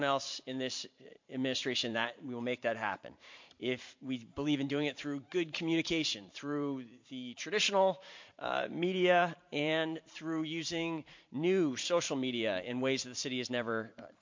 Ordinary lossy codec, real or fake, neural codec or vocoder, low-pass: MP3, 48 kbps; fake; vocoder, 44.1 kHz, 128 mel bands every 512 samples, BigVGAN v2; 7.2 kHz